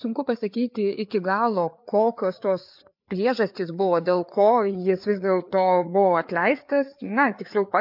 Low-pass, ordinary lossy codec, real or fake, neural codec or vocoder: 5.4 kHz; MP3, 48 kbps; fake; codec, 16 kHz, 4 kbps, FreqCodec, larger model